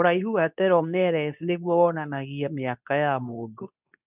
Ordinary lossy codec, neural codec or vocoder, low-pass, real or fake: none; codec, 24 kHz, 0.9 kbps, WavTokenizer, medium speech release version 2; 3.6 kHz; fake